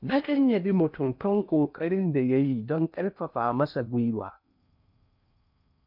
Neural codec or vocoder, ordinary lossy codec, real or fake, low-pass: codec, 16 kHz in and 24 kHz out, 0.6 kbps, FocalCodec, streaming, 2048 codes; none; fake; 5.4 kHz